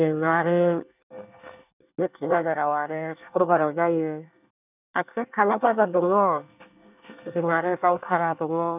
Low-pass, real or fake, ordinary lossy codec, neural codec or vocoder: 3.6 kHz; fake; none; codec, 24 kHz, 1 kbps, SNAC